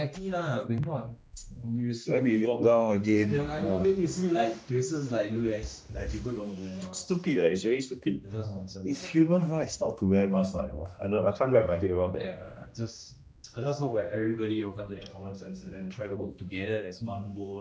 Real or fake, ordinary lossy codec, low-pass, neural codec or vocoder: fake; none; none; codec, 16 kHz, 1 kbps, X-Codec, HuBERT features, trained on general audio